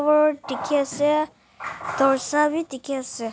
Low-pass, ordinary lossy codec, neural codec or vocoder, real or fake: none; none; none; real